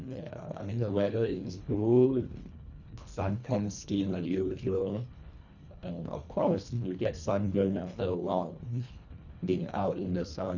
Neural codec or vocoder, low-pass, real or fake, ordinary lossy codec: codec, 24 kHz, 1.5 kbps, HILCodec; 7.2 kHz; fake; none